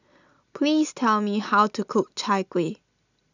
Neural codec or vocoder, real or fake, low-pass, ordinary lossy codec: none; real; 7.2 kHz; none